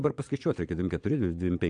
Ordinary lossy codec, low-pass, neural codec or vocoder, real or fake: AAC, 64 kbps; 9.9 kHz; vocoder, 22.05 kHz, 80 mel bands, Vocos; fake